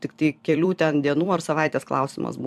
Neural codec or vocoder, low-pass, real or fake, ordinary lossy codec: vocoder, 44.1 kHz, 128 mel bands every 256 samples, BigVGAN v2; 14.4 kHz; fake; AAC, 96 kbps